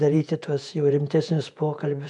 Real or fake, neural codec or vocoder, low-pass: fake; vocoder, 48 kHz, 128 mel bands, Vocos; 10.8 kHz